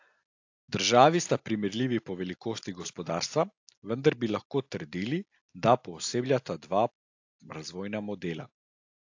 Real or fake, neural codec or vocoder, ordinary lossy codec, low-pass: real; none; AAC, 48 kbps; 7.2 kHz